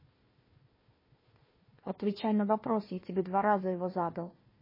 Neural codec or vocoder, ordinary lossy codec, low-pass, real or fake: codec, 16 kHz, 1 kbps, FunCodec, trained on Chinese and English, 50 frames a second; MP3, 24 kbps; 5.4 kHz; fake